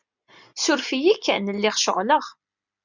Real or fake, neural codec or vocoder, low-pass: real; none; 7.2 kHz